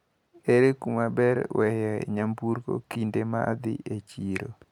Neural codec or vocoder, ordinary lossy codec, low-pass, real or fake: none; none; 19.8 kHz; real